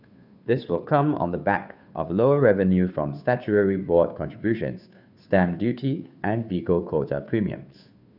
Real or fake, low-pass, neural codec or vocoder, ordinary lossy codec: fake; 5.4 kHz; codec, 16 kHz, 2 kbps, FunCodec, trained on Chinese and English, 25 frames a second; none